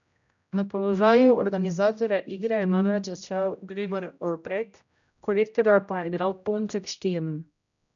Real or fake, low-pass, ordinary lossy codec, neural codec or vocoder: fake; 7.2 kHz; none; codec, 16 kHz, 0.5 kbps, X-Codec, HuBERT features, trained on general audio